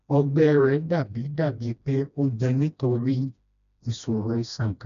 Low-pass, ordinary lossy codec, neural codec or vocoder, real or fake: 7.2 kHz; MP3, 96 kbps; codec, 16 kHz, 1 kbps, FreqCodec, smaller model; fake